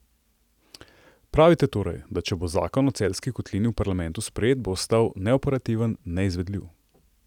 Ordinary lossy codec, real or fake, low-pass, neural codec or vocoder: none; real; 19.8 kHz; none